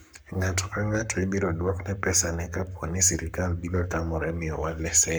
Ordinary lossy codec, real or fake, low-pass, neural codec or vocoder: none; fake; none; codec, 44.1 kHz, 7.8 kbps, Pupu-Codec